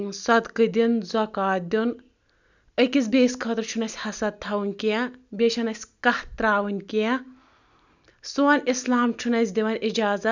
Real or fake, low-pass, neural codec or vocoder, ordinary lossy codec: real; 7.2 kHz; none; none